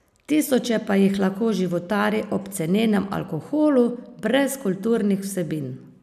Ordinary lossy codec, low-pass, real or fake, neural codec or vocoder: none; 14.4 kHz; real; none